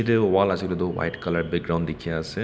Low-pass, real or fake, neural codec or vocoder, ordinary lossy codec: none; real; none; none